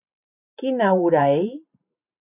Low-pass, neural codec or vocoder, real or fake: 3.6 kHz; vocoder, 44.1 kHz, 128 mel bands every 512 samples, BigVGAN v2; fake